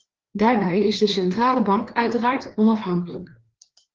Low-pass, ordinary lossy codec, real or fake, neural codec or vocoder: 7.2 kHz; Opus, 16 kbps; fake; codec, 16 kHz, 2 kbps, FreqCodec, larger model